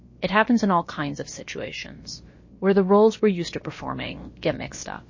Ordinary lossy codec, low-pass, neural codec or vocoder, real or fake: MP3, 32 kbps; 7.2 kHz; codec, 16 kHz, about 1 kbps, DyCAST, with the encoder's durations; fake